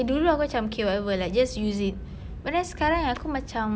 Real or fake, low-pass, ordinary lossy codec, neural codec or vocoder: real; none; none; none